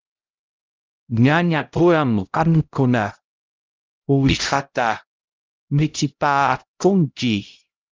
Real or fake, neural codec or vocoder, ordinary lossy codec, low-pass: fake; codec, 16 kHz, 0.5 kbps, X-Codec, HuBERT features, trained on LibriSpeech; Opus, 24 kbps; 7.2 kHz